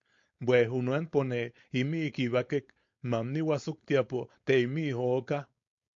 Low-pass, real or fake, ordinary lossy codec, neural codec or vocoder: 7.2 kHz; fake; MP3, 48 kbps; codec, 16 kHz, 4.8 kbps, FACodec